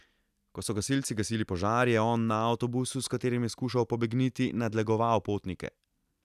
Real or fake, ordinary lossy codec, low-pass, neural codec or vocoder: real; none; 14.4 kHz; none